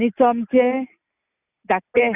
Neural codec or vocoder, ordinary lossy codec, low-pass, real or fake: none; none; 3.6 kHz; real